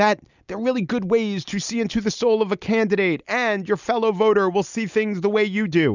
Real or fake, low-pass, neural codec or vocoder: real; 7.2 kHz; none